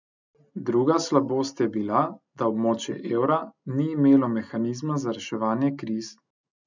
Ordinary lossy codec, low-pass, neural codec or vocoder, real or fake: none; 7.2 kHz; none; real